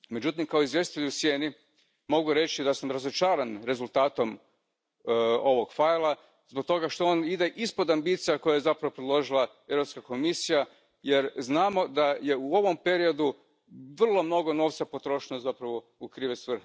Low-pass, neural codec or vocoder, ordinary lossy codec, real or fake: none; none; none; real